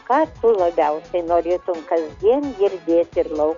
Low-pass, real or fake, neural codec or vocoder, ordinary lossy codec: 7.2 kHz; real; none; MP3, 64 kbps